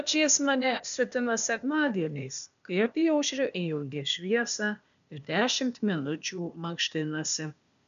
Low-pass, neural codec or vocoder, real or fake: 7.2 kHz; codec, 16 kHz, 0.8 kbps, ZipCodec; fake